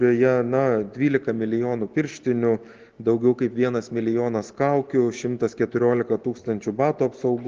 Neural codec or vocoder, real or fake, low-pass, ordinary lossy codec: none; real; 7.2 kHz; Opus, 16 kbps